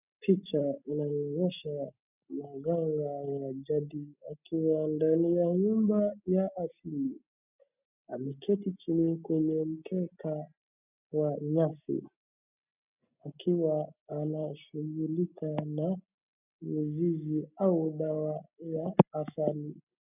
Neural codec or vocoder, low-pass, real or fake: none; 3.6 kHz; real